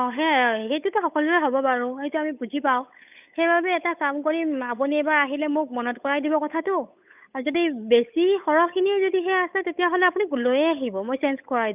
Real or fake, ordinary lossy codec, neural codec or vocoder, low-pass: fake; none; codec, 16 kHz, 8 kbps, FunCodec, trained on Chinese and English, 25 frames a second; 3.6 kHz